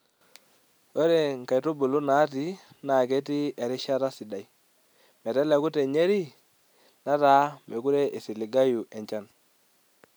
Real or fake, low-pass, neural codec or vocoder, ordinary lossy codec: real; none; none; none